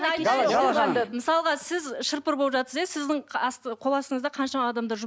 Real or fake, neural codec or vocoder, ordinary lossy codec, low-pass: real; none; none; none